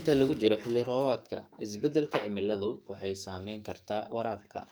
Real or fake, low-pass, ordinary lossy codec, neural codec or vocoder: fake; none; none; codec, 44.1 kHz, 2.6 kbps, SNAC